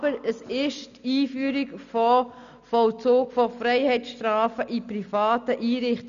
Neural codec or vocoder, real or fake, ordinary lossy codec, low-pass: none; real; none; 7.2 kHz